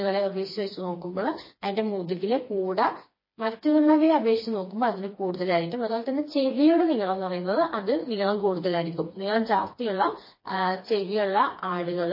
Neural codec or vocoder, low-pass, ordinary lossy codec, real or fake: codec, 16 kHz, 2 kbps, FreqCodec, smaller model; 5.4 kHz; MP3, 24 kbps; fake